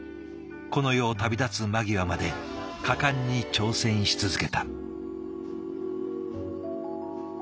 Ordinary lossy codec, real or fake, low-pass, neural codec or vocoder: none; real; none; none